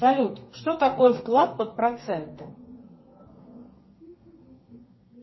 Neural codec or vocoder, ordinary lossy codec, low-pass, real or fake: codec, 32 kHz, 1.9 kbps, SNAC; MP3, 24 kbps; 7.2 kHz; fake